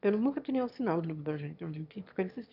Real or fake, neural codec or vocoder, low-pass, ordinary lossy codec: fake; autoencoder, 22.05 kHz, a latent of 192 numbers a frame, VITS, trained on one speaker; 5.4 kHz; none